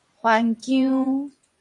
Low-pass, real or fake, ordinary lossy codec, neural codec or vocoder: 10.8 kHz; fake; MP3, 64 kbps; vocoder, 24 kHz, 100 mel bands, Vocos